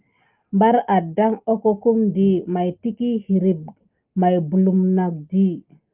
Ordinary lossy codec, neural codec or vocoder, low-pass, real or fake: Opus, 32 kbps; none; 3.6 kHz; real